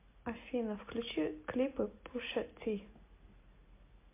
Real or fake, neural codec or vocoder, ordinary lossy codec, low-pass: real; none; MP3, 24 kbps; 3.6 kHz